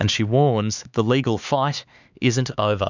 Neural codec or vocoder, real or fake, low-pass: codec, 16 kHz, 4 kbps, X-Codec, HuBERT features, trained on LibriSpeech; fake; 7.2 kHz